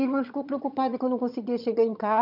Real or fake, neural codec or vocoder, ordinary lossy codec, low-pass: fake; vocoder, 22.05 kHz, 80 mel bands, HiFi-GAN; none; 5.4 kHz